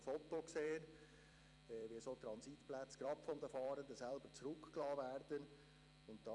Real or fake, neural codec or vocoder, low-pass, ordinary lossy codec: fake; vocoder, 44.1 kHz, 128 mel bands every 256 samples, BigVGAN v2; 10.8 kHz; none